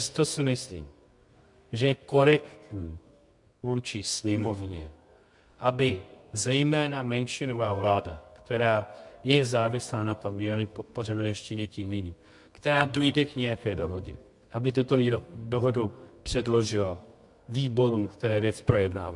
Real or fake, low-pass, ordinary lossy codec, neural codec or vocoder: fake; 10.8 kHz; MP3, 64 kbps; codec, 24 kHz, 0.9 kbps, WavTokenizer, medium music audio release